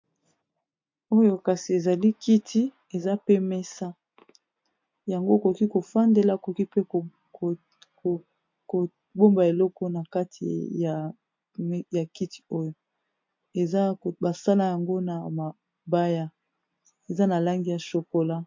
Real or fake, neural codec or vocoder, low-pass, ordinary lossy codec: real; none; 7.2 kHz; MP3, 48 kbps